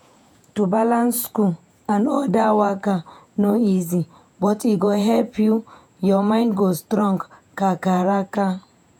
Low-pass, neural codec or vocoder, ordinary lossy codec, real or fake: 19.8 kHz; vocoder, 48 kHz, 128 mel bands, Vocos; none; fake